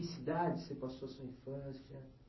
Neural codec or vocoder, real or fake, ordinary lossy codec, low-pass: none; real; MP3, 24 kbps; 7.2 kHz